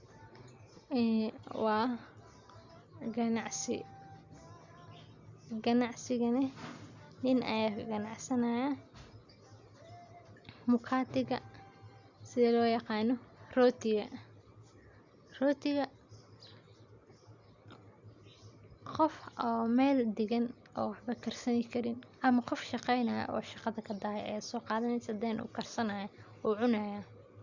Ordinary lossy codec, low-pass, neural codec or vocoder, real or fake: Opus, 64 kbps; 7.2 kHz; none; real